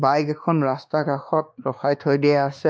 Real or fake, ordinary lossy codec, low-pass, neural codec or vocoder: fake; none; none; codec, 16 kHz, 2 kbps, X-Codec, WavLM features, trained on Multilingual LibriSpeech